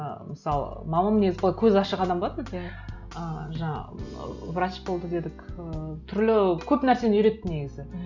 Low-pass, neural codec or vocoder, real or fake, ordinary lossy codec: 7.2 kHz; none; real; none